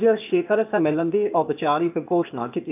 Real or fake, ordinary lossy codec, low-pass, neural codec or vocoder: fake; none; 3.6 kHz; codec, 16 kHz, 0.8 kbps, ZipCodec